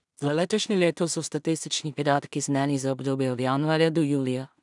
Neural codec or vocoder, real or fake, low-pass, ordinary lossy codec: codec, 16 kHz in and 24 kHz out, 0.4 kbps, LongCat-Audio-Codec, two codebook decoder; fake; 10.8 kHz; none